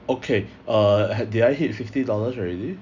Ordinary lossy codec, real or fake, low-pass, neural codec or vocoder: none; real; 7.2 kHz; none